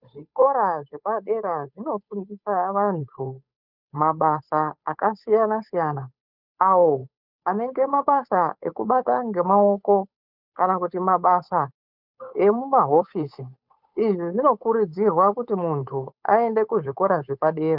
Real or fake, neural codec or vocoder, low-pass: fake; codec, 16 kHz, 8 kbps, FunCodec, trained on Chinese and English, 25 frames a second; 5.4 kHz